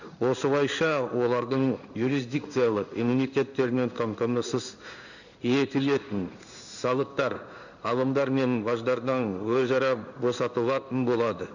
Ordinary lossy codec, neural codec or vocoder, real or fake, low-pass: none; codec, 16 kHz in and 24 kHz out, 1 kbps, XY-Tokenizer; fake; 7.2 kHz